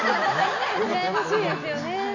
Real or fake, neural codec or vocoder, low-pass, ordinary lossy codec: real; none; 7.2 kHz; none